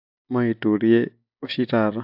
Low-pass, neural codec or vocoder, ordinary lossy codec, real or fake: 5.4 kHz; none; AAC, 48 kbps; real